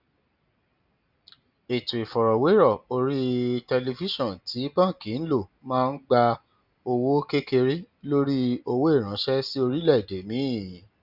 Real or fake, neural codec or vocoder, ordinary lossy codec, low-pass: real; none; none; 5.4 kHz